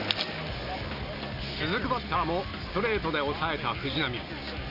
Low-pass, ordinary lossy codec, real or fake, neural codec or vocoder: 5.4 kHz; none; real; none